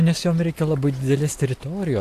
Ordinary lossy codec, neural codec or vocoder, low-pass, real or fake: AAC, 64 kbps; none; 14.4 kHz; real